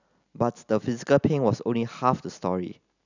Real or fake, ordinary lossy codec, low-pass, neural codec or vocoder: real; none; 7.2 kHz; none